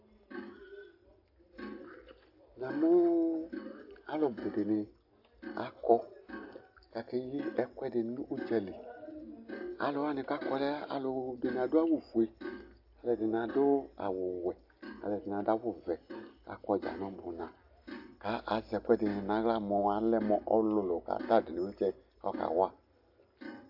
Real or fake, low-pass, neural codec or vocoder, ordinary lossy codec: real; 5.4 kHz; none; AAC, 48 kbps